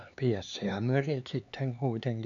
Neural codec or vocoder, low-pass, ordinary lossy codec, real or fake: codec, 16 kHz, 4 kbps, X-Codec, HuBERT features, trained on LibriSpeech; 7.2 kHz; none; fake